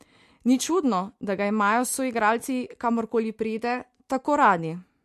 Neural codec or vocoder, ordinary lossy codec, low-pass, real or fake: none; MP3, 64 kbps; 14.4 kHz; real